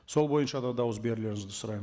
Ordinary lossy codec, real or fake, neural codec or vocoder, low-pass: none; real; none; none